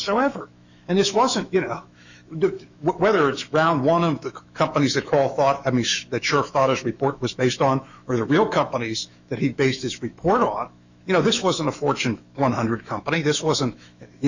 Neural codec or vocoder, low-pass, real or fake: autoencoder, 48 kHz, 128 numbers a frame, DAC-VAE, trained on Japanese speech; 7.2 kHz; fake